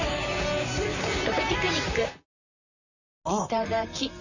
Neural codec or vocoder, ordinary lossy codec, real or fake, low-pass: vocoder, 44.1 kHz, 128 mel bands, Pupu-Vocoder; none; fake; 7.2 kHz